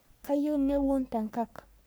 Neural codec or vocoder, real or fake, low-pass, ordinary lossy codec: codec, 44.1 kHz, 3.4 kbps, Pupu-Codec; fake; none; none